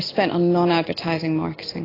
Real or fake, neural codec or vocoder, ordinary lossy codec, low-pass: real; none; AAC, 24 kbps; 5.4 kHz